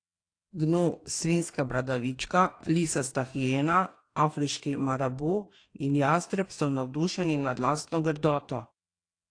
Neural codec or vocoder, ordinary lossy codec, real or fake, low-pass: codec, 44.1 kHz, 2.6 kbps, DAC; AAC, 48 kbps; fake; 9.9 kHz